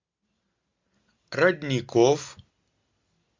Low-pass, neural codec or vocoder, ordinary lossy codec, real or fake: 7.2 kHz; none; MP3, 64 kbps; real